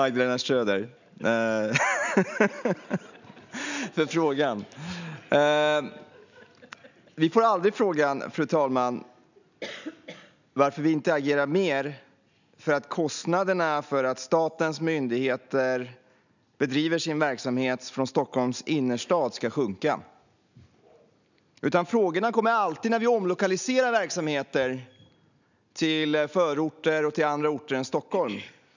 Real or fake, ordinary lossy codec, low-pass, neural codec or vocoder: real; none; 7.2 kHz; none